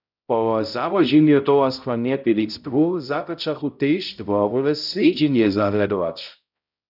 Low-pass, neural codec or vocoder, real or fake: 5.4 kHz; codec, 16 kHz, 0.5 kbps, X-Codec, HuBERT features, trained on balanced general audio; fake